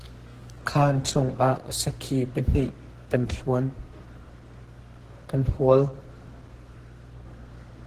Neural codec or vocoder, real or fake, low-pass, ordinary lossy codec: codec, 32 kHz, 1.9 kbps, SNAC; fake; 14.4 kHz; Opus, 16 kbps